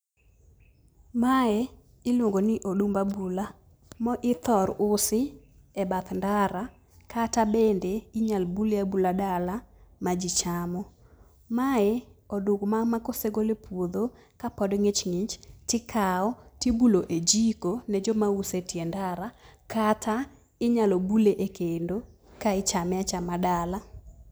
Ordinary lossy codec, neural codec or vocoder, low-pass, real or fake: none; none; none; real